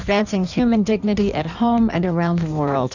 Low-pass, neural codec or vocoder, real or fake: 7.2 kHz; codec, 16 kHz in and 24 kHz out, 1.1 kbps, FireRedTTS-2 codec; fake